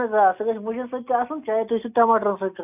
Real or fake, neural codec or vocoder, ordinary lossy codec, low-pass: real; none; none; 3.6 kHz